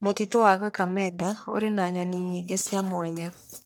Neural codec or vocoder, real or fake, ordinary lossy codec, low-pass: codec, 44.1 kHz, 1.7 kbps, Pupu-Codec; fake; none; none